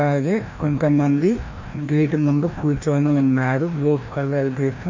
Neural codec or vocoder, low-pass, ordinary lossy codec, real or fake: codec, 16 kHz, 1 kbps, FreqCodec, larger model; 7.2 kHz; MP3, 48 kbps; fake